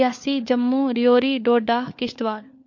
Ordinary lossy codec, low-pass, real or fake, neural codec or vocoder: MP3, 48 kbps; 7.2 kHz; fake; codec, 16 kHz, 4 kbps, FunCodec, trained on LibriTTS, 50 frames a second